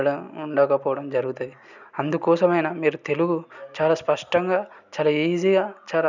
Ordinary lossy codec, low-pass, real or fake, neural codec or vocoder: none; 7.2 kHz; real; none